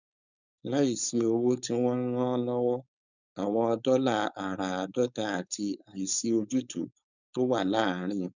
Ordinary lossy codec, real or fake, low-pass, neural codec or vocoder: none; fake; 7.2 kHz; codec, 16 kHz, 4.8 kbps, FACodec